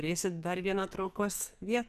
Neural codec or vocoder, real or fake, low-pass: codec, 44.1 kHz, 2.6 kbps, SNAC; fake; 14.4 kHz